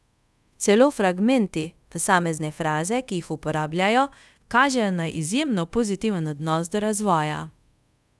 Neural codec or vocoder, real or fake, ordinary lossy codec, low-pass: codec, 24 kHz, 0.5 kbps, DualCodec; fake; none; none